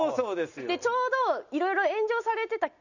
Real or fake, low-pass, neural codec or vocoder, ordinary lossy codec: real; 7.2 kHz; none; none